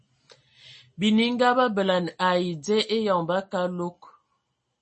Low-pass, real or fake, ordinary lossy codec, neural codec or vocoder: 10.8 kHz; real; MP3, 32 kbps; none